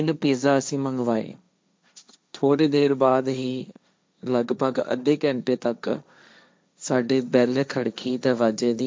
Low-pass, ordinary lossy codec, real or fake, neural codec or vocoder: none; none; fake; codec, 16 kHz, 1.1 kbps, Voila-Tokenizer